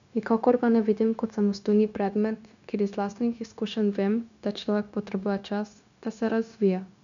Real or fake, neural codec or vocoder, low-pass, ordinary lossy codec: fake; codec, 16 kHz, 0.9 kbps, LongCat-Audio-Codec; 7.2 kHz; MP3, 96 kbps